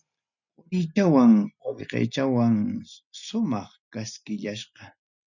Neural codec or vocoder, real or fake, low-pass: none; real; 7.2 kHz